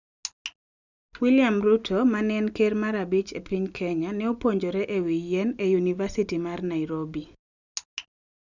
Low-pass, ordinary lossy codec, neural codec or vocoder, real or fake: 7.2 kHz; none; none; real